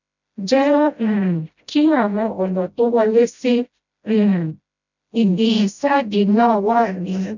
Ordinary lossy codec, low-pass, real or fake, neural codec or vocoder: none; 7.2 kHz; fake; codec, 16 kHz, 0.5 kbps, FreqCodec, smaller model